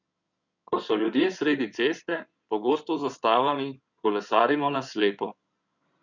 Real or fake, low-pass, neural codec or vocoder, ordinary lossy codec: fake; 7.2 kHz; codec, 16 kHz in and 24 kHz out, 2.2 kbps, FireRedTTS-2 codec; none